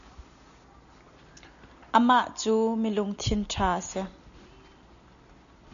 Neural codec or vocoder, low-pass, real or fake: none; 7.2 kHz; real